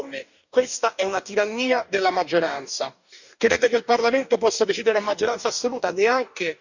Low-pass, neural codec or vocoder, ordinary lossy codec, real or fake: 7.2 kHz; codec, 44.1 kHz, 2.6 kbps, DAC; none; fake